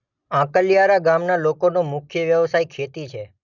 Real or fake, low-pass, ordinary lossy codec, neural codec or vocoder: real; 7.2 kHz; none; none